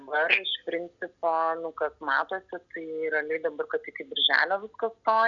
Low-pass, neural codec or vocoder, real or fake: 7.2 kHz; none; real